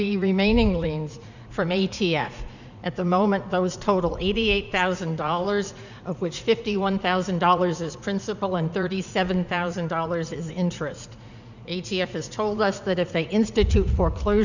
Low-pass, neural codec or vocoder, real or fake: 7.2 kHz; vocoder, 44.1 kHz, 80 mel bands, Vocos; fake